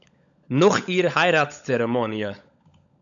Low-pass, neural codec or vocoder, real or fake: 7.2 kHz; codec, 16 kHz, 16 kbps, FunCodec, trained on LibriTTS, 50 frames a second; fake